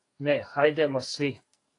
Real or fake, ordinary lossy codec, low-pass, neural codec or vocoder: fake; AAC, 48 kbps; 10.8 kHz; codec, 44.1 kHz, 2.6 kbps, SNAC